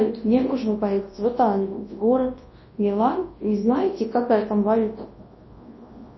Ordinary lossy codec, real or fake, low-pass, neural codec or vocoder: MP3, 24 kbps; fake; 7.2 kHz; codec, 24 kHz, 0.9 kbps, WavTokenizer, large speech release